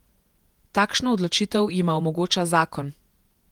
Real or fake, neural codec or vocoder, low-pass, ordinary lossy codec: fake; vocoder, 48 kHz, 128 mel bands, Vocos; 19.8 kHz; Opus, 24 kbps